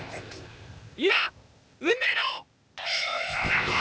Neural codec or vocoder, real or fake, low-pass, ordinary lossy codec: codec, 16 kHz, 0.8 kbps, ZipCodec; fake; none; none